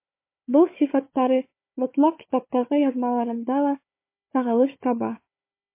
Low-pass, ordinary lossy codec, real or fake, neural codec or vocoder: 3.6 kHz; MP3, 24 kbps; fake; codec, 16 kHz, 4 kbps, FunCodec, trained on Chinese and English, 50 frames a second